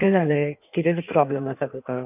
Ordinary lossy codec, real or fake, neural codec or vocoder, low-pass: none; fake; codec, 16 kHz in and 24 kHz out, 1.1 kbps, FireRedTTS-2 codec; 3.6 kHz